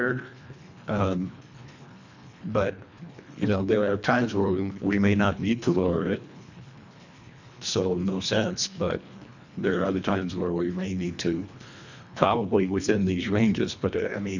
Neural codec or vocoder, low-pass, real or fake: codec, 24 kHz, 1.5 kbps, HILCodec; 7.2 kHz; fake